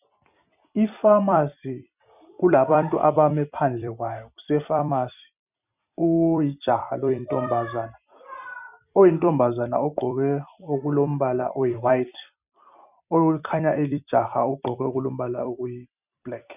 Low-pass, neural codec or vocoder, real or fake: 3.6 kHz; vocoder, 44.1 kHz, 128 mel bands every 256 samples, BigVGAN v2; fake